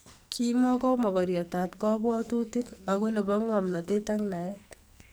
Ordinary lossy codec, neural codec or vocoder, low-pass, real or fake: none; codec, 44.1 kHz, 2.6 kbps, SNAC; none; fake